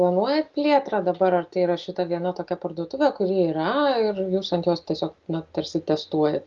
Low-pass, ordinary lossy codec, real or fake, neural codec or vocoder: 7.2 kHz; Opus, 32 kbps; real; none